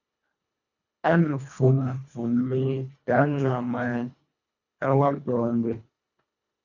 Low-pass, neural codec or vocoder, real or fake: 7.2 kHz; codec, 24 kHz, 1.5 kbps, HILCodec; fake